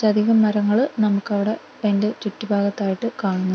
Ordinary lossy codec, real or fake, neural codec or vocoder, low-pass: none; real; none; none